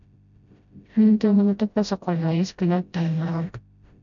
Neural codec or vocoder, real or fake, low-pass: codec, 16 kHz, 0.5 kbps, FreqCodec, smaller model; fake; 7.2 kHz